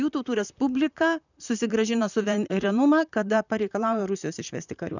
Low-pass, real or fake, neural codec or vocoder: 7.2 kHz; fake; vocoder, 44.1 kHz, 128 mel bands, Pupu-Vocoder